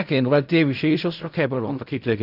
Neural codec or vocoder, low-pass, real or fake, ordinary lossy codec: codec, 16 kHz in and 24 kHz out, 0.4 kbps, LongCat-Audio-Codec, fine tuned four codebook decoder; 5.4 kHz; fake; none